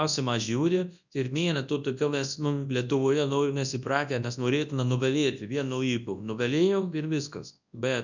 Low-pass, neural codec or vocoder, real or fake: 7.2 kHz; codec, 24 kHz, 0.9 kbps, WavTokenizer, large speech release; fake